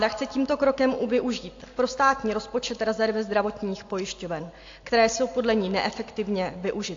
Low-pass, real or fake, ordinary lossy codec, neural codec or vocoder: 7.2 kHz; real; AAC, 48 kbps; none